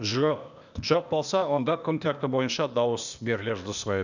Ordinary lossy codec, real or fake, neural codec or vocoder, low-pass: none; fake; codec, 16 kHz, 0.8 kbps, ZipCodec; 7.2 kHz